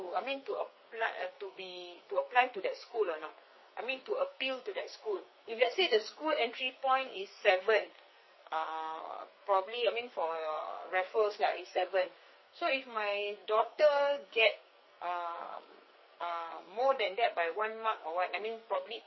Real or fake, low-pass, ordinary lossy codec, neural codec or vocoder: fake; 7.2 kHz; MP3, 24 kbps; codec, 44.1 kHz, 2.6 kbps, SNAC